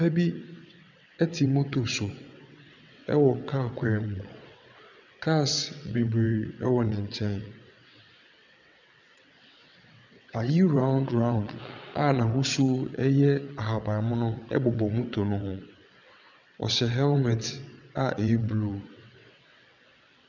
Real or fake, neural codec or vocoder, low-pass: fake; vocoder, 22.05 kHz, 80 mel bands, WaveNeXt; 7.2 kHz